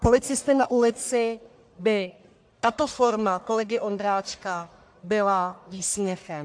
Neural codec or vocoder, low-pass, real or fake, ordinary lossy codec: codec, 44.1 kHz, 1.7 kbps, Pupu-Codec; 9.9 kHz; fake; AAC, 64 kbps